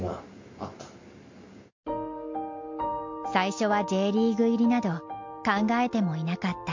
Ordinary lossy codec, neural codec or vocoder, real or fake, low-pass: MP3, 48 kbps; none; real; 7.2 kHz